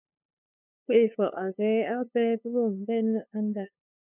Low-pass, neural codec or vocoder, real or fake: 3.6 kHz; codec, 16 kHz, 2 kbps, FunCodec, trained on LibriTTS, 25 frames a second; fake